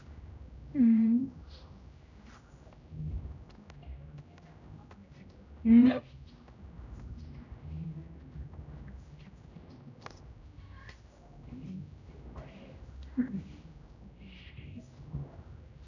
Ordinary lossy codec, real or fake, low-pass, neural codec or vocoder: none; fake; 7.2 kHz; codec, 16 kHz, 0.5 kbps, X-Codec, HuBERT features, trained on general audio